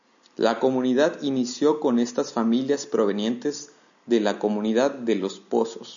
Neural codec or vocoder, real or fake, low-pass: none; real; 7.2 kHz